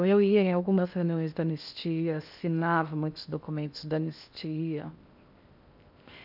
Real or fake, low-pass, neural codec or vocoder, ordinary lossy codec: fake; 5.4 kHz; codec, 16 kHz in and 24 kHz out, 0.8 kbps, FocalCodec, streaming, 65536 codes; none